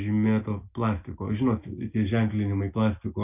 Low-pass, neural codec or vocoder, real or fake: 3.6 kHz; none; real